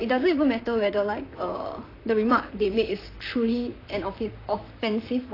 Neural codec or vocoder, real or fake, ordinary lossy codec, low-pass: vocoder, 44.1 kHz, 128 mel bands, Pupu-Vocoder; fake; AAC, 24 kbps; 5.4 kHz